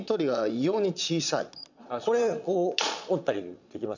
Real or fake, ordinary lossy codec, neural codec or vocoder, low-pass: fake; none; vocoder, 22.05 kHz, 80 mel bands, Vocos; 7.2 kHz